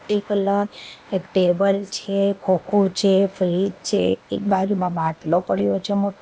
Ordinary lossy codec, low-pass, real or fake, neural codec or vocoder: none; none; fake; codec, 16 kHz, 0.8 kbps, ZipCodec